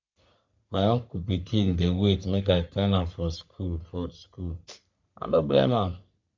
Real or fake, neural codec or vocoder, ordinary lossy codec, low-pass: fake; codec, 44.1 kHz, 3.4 kbps, Pupu-Codec; MP3, 64 kbps; 7.2 kHz